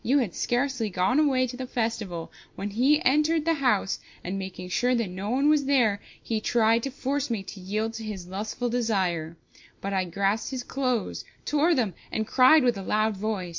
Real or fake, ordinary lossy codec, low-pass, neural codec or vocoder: real; MP3, 48 kbps; 7.2 kHz; none